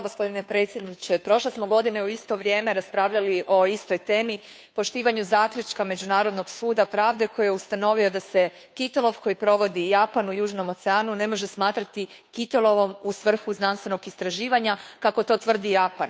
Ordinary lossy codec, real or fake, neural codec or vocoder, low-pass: none; fake; codec, 16 kHz, 2 kbps, FunCodec, trained on Chinese and English, 25 frames a second; none